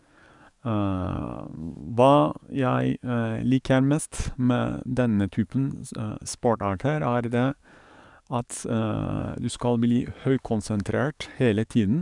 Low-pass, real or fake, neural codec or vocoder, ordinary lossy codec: 10.8 kHz; fake; codec, 44.1 kHz, 7.8 kbps, DAC; none